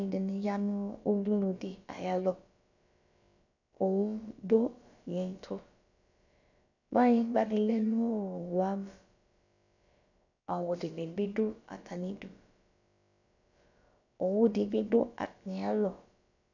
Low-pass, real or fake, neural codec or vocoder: 7.2 kHz; fake; codec, 16 kHz, about 1 kbps, DyCAST, with the encoder's durations